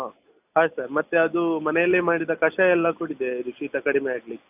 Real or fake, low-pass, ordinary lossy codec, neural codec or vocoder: real; 3.6 kHz; AAC, 32 kbps; none